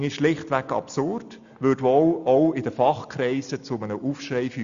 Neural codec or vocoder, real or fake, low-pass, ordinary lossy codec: none; real; 7.2 kHz; Opus, 64 kbps